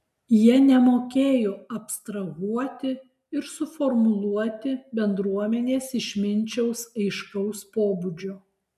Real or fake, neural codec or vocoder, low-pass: real; none; 14.4 kHz